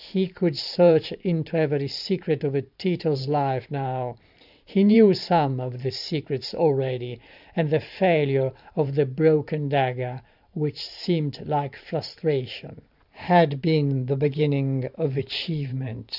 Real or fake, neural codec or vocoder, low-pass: fake; vocoder, 44.1 kHz, 128 mel bands every 512 samples, BigVGAN v2; 5.4 kHz